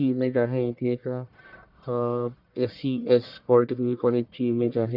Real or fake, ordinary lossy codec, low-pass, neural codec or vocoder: fake; none; 5.4 kHz; codec, 44.1 kHz, 1.7 kbps, Pupu-Codec